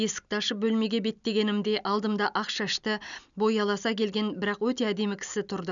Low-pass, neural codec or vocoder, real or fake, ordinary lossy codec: 7.2 kHz; none; real; none